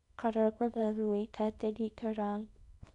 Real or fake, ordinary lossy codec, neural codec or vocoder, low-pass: fake; none; codec, 24 kHz, 0.9 kbps, WavTokenizer, small release; 10.8 kHz